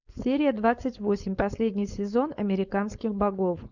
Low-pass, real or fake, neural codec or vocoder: 7.2 kHz; fake; codec, 16 kHz, 4.8 kbps, FACodec